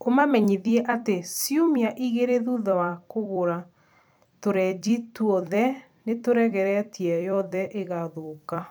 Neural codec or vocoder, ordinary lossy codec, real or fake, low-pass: vocoder, 44.1 kHz, 128 mel bands every 256 samples, BigVGAN v2; none; fake; none